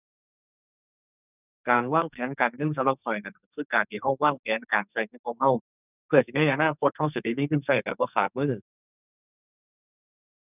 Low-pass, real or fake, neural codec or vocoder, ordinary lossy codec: 3.6 kHz; fake; codec, 44.1 kHz, 2.6 kbps, SNAC; none